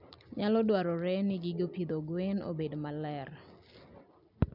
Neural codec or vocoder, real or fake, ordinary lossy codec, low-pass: none; real; none; 5.4 kHz